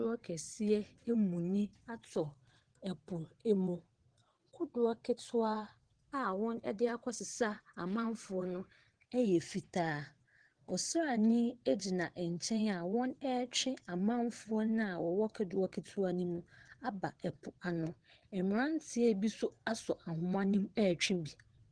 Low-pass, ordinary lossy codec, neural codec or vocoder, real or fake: 9.9 kHz; Opus, 16 kbps; vocoder, 22.05 kHz, 80 mel bands, WaveNeXt; fake